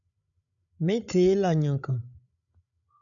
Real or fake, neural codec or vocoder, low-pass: fake; codec, 16 kHz, 16 kbps, FreqCodec, larger model; 7.2 kHz